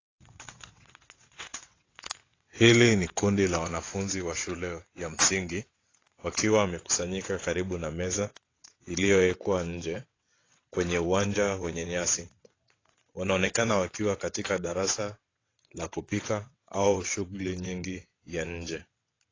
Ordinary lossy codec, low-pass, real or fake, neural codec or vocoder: AAC, 32 kbps; 7.2 kHz; fake; vocoder, 22.05 kHz, 80 mel bands, WaveNeXt